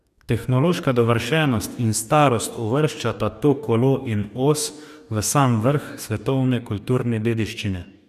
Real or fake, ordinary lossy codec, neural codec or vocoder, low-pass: fake; none; codec, 44.1 kHz, 2.6 kbps, DAC; 14.4 kHz